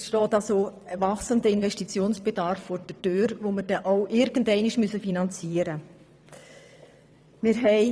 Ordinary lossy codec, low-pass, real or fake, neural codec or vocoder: none; none; fake; vocoder, 22.05 kHz, 80 mel bands, WaveNeXt